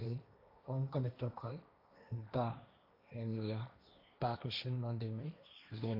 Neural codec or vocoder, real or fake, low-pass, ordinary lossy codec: codec, 16 kHz, 1.1 kbps, Voila-Tokenizer; fake; 5.4 kHz; none